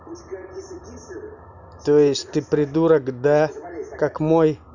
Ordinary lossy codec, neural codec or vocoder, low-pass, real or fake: none; none; 7.2 kHz; real